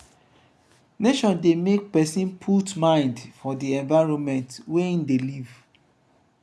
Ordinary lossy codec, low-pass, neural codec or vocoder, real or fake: none; none; none; real